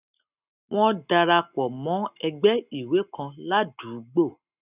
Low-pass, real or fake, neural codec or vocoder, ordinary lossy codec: 3.6 kHz; real; none; none